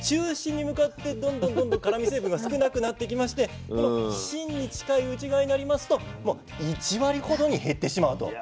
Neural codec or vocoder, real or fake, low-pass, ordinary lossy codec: none; real; none; none